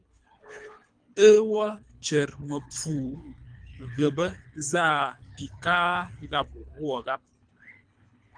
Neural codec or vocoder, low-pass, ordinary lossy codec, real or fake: codec, 24 kHz, 3 kbps, HILCodec; 9.9 kHz; Opus, 32 kbps; fake